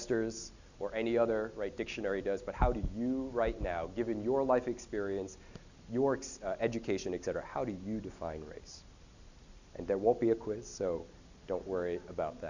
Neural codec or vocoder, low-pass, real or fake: none; 7.2 kHz; real